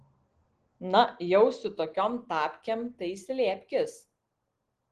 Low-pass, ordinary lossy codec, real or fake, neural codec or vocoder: 10.8 kHz; Opus, 16 kbps; real; none